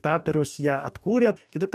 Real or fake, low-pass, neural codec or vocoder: fake; 14.4 kHz; codec, 44.1 kHz, 2.6 kbps, DAC